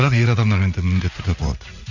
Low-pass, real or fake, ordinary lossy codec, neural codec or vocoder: 7.2 kHz; fake; none; vocoder, 44.1 kHz, 80 mel bands, Vocos